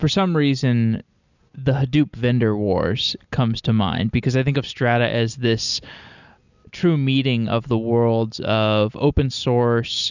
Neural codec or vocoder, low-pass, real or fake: none; 7.2 kHz; real